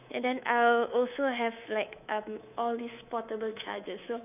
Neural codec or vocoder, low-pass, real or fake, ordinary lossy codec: codec, 24 kHz, 3.1 kbps, DualCodec; 3.6 kHz; fake; none